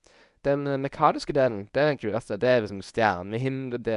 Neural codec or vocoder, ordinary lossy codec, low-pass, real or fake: codec, 24 kHz, 0.9 kbps, WavTokenizer, medium speech release version 1; none; 10.8 kHz; fake